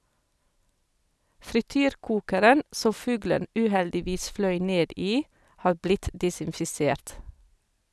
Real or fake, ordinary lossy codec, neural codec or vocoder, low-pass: real; none; none; none